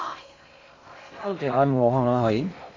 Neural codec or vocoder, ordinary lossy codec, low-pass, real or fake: codec, 16 kHz in and 24 kHz out, 0.6 kbps, FocalCodec, streaming, 2048 codes; MP3, 48 kbps; 7.2 kHz; fake